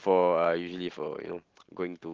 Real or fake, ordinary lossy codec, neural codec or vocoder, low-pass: real; Opus, 16 kbps; none; 7.2 kHz